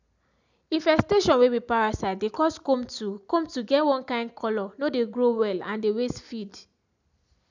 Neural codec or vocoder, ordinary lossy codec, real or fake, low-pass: none; none; real; 7.2 kHz